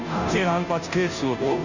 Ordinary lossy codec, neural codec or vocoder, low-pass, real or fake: none; codec, 16 kHz, 0.5 kbps, FunCodec, trained on Chinese and English, 25 frames a second; 7.2 kHz; fake